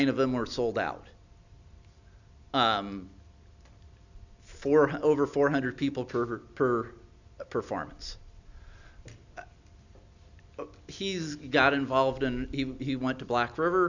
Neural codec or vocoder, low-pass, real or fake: none; 7.2 kHz; real